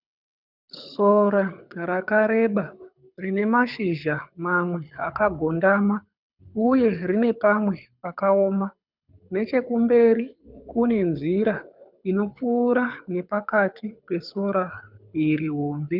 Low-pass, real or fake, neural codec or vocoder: 5.4 kHz; fake; codec, 24 kHz, 6 kbps, HILCodec